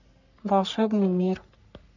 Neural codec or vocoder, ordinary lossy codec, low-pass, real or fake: codec, 44.1 kHz, 3.4 kbps, Pupu-Codec; none; 7.2 kHz; fake